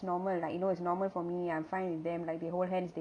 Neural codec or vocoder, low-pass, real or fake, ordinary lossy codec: none; 9.9 kHz; real; none